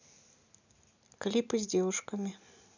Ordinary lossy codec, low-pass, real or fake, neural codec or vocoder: none; 7.2 kHz; real; none